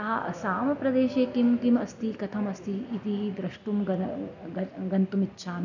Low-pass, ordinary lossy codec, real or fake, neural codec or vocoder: 7.2 kHz; none; real; none